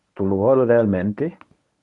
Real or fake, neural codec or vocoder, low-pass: fake; codec, 24 kHz, 0.9 kbps, WavTokenizer, medium speech release version 1; 10.8 kHz